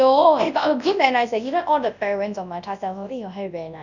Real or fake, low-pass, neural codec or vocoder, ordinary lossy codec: fake; 7.2 kHz; codec, 24 kHz, 0.9 kbps, WavTokenizer, large speech release; none